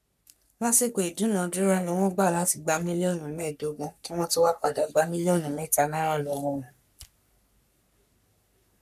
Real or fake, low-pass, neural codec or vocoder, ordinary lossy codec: fake; 14.4 kHz; codec, 44.1 kHz, 3.4 kbps, Pupu-Codec; none